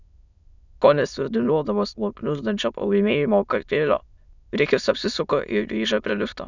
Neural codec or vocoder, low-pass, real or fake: autoencoder, 22.05 kHz, a latent of 192 numbers a frame, VITS, trained on many speakers; 7.2 kHz; fake